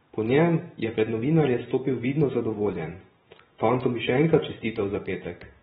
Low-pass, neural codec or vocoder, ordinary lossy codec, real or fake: 19.8 kHz; vocoder, 44.1 kHz, 128 mel bands every 512 samples, BigVGAN v2; AAC, 16 kbps; fake